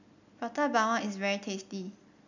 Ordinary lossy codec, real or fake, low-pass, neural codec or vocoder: none; real; 7.2 kHz; none